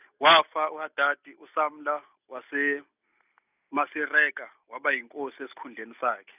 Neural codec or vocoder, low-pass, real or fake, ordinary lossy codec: none; 3.6 kHz; real; none